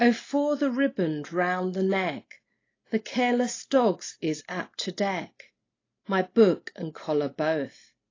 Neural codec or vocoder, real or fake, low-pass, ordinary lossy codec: none; real; 7.2 kHz; AAC, 32 kbps